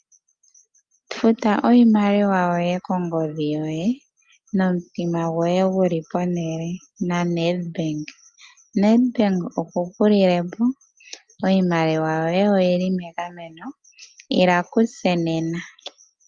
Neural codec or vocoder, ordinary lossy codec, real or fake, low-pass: none; Opus, 24 kbps; real; 9.9 kHz